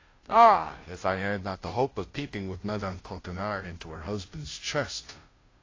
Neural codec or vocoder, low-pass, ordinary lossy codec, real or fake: codec, 16 kHz, 0.5 kbps, FunCodec, trained on Chinese and English, 25 frames a second; 7.2 kHz; AAC, 32 kbps; fake